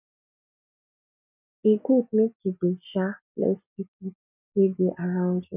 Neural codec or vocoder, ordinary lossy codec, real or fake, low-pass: none; none; real; 3.6 kHz